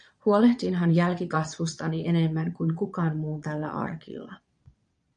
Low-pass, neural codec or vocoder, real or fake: 9.9 kHz; vocoder, 22.05 kHz, 80 mel bands, Vocos; fake